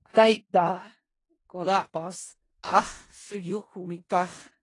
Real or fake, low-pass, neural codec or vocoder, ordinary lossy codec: fake; 10.8 kHz; codec, 16 kHz in and 24 kHz out, 0.4 kbps, LongCat-Audio-Codec, four codebook decoder; AAC, 32 kbps